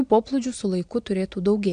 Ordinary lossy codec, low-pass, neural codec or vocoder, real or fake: AAC, 64 kbps; 9.9 kHz; none; real